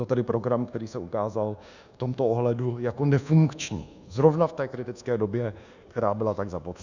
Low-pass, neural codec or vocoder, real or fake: 7.2 kHz; codec, 24 kHz, 1.2 kbps, DualCodec; fake